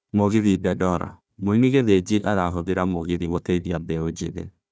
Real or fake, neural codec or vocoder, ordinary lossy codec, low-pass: fake; codec, 16 kHz, 1 kbps, FunCodec, trained on Chinese and English, 50 frames a second; none; none